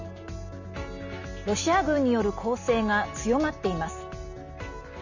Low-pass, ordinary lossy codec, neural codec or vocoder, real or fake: 7.2 kHz; none; none; real